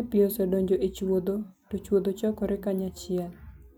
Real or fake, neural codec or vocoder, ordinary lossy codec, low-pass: real; none; none; none